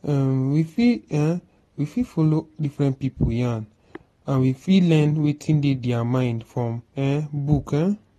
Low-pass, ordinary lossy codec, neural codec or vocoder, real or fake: 19.8 kHz; AAC, 32 kbps; none; real